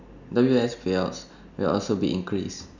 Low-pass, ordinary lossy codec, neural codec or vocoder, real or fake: 7.2 kHz; none; none; real